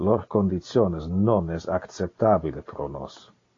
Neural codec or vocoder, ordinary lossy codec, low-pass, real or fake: none; AAC, 32 kbps; 7.2 kHz; real